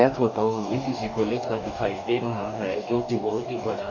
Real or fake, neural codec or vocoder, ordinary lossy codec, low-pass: fake; codec, 44.1 kHz, 2.6 kbps, DAC; none; 7.2 kHz